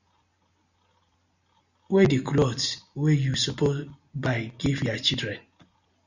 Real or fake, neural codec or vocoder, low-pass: real; none; 7.2 kHz